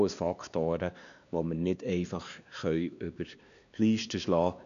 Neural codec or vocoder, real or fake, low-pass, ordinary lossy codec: codec, 16 kHz, 2 kbps, X-Codec, WavLM features, trained on Multilingual LibriSpeech; fake; 7.2 kHz; none